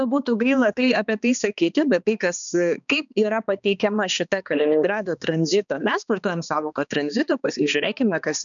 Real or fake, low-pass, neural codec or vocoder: fake; 7.2 kHz; codec, 16 kHz, 2 kbps, X-Codec, HuBERT features, trained on balanced general audio